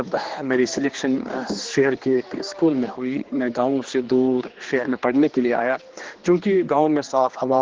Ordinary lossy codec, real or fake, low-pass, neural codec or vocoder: Opus, 16 kbps; fake; 7.2 kHz; codec, 16 kHz, 2 kbps, X-Codec, HuBERT features, trained on general audio